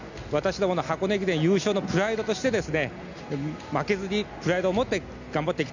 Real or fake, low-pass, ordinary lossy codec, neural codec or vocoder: real; 7.2 kHz; none; none